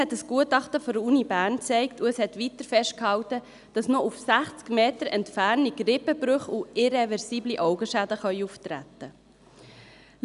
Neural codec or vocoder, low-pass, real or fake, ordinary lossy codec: none; 10.8 kHz; real; none